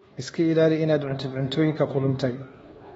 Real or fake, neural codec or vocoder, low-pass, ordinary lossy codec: fake; codec, 24 kHz, 1.2 kbps, DualCodec; 10.8 kHz; AAC, 24 kbps